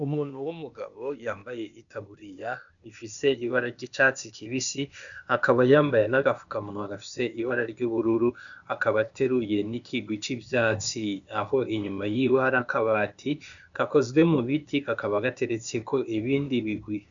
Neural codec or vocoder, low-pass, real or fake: codec, 16 kHz, 0.8 kbps, ZipCodec; 7.2 kHz; fake